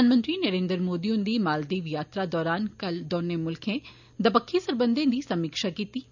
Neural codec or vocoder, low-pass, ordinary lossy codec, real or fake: none; 7.2 kHz; none; real